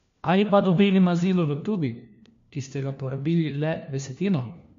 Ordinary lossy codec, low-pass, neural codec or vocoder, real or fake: MP3, 48 kbps; 7.2 kHz; codec, 16 kHz, 1 kbps, FunCodec, trained on LibriTTS, 50 frames a second; fake